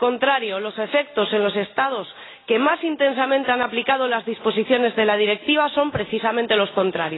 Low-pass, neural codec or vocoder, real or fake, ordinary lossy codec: 7.2 kHz; none; real; AAC, 16 kbps